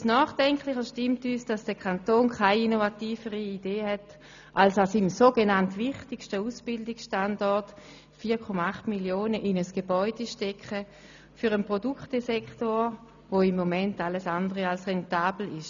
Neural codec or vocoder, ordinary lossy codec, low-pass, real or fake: none; none; 7.2 kHz; real